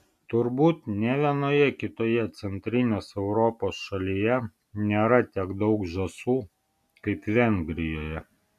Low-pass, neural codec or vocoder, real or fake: 14.4 kHz; none; real